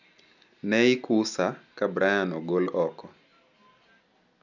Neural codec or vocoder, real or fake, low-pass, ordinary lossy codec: none; real; 7.2 kHz; none